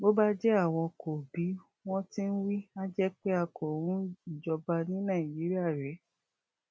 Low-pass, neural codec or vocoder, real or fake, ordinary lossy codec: none; none; real; none